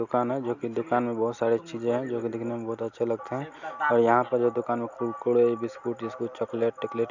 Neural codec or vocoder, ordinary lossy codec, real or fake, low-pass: none; none; real; 7.2 kHz